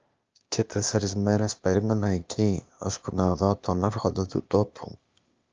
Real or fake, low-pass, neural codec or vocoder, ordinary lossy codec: fake; 7.2 kHz; codec, 16 kHz, 0.8 kbps, ZipCodec; Opus, 24 kbps